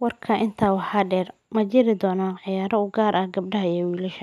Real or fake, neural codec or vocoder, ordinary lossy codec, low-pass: real; none; none; 14.4 kHz